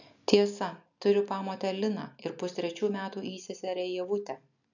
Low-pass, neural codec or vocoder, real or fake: 7.2 kHz; none; real